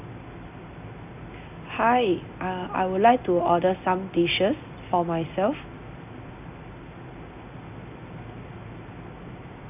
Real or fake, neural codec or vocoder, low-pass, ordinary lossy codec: real; none; 3.6 kHz; none